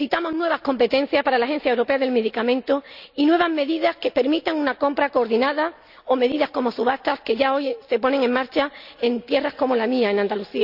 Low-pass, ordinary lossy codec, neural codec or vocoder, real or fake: 5.4 kHz; none; none; real